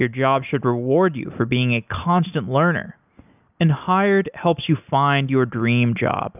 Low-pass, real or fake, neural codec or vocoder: 3.6 kHz; real; none